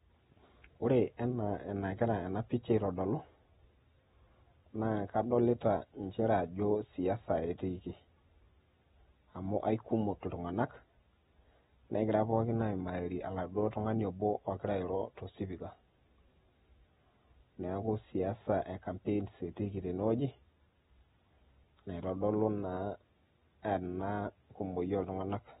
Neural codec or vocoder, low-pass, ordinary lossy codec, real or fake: none; 19.8 kHz; AAC, 16 kbps; real